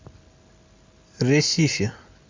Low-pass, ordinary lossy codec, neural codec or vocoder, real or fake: 7.2 kHz; MP3, 64 kbps; none; real